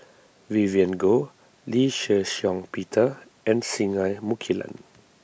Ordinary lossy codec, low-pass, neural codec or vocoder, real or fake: none; none; none; real